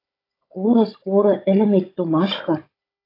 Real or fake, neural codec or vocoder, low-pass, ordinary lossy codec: fake; codec, 16 kHz, 16 kbps, FunCodec, trained on Chinese and English, 50 frames a second; 5.4 kHz; AAC, 24 kbps